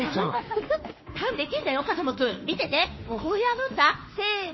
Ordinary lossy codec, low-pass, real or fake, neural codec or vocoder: MP3, 24 kbps; 7.2 kHz; fake; autoencoder, 48 kHz, 32 numbers a frame, DAC-VAE, trained on Japanese speech